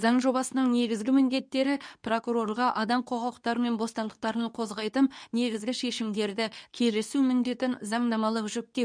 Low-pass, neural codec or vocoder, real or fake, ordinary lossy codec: 9.9 kHz; codec, 24 kHz, 0.9 kbps, WavTokenizer, medium speech release version 2; fake; none